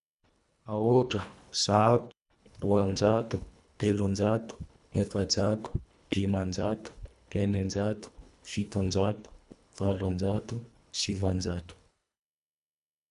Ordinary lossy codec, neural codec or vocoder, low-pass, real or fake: none; codec, 24 kHz, 1.5 kbps, HILCodec; 10.8 kHz; fake